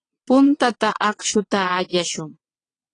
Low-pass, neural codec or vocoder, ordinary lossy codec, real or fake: 9.9 kHz; vocoder, 22.05 kHz, 80 mel bands, WaveNeXt; AAC, 32 kbps; fake